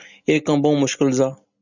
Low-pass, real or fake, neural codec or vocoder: 7.2 kHz; real; none